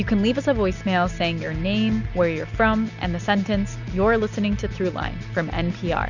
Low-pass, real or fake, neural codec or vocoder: 7.2 kHz; real; none